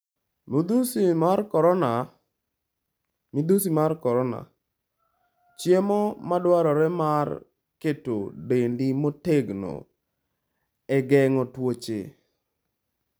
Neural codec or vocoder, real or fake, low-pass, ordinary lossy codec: none; real; none; none